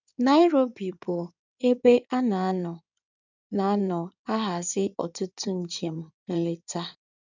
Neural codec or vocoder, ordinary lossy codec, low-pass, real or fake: codec, 16 kHz in and 24 kHz out, 2.2 kbps, FireRedTTS-2 codec; none; 7.2 kHz; fake